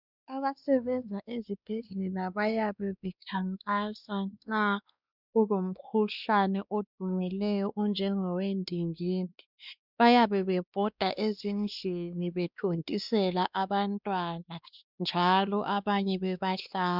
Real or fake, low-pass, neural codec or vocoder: fake; 5.4 kHz; codec, 16 kHz, 2 kbps, X-Codec, WavLM features, trained on Multilingual LibriSpeech